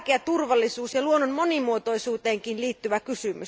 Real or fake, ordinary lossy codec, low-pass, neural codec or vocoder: real; none; none; none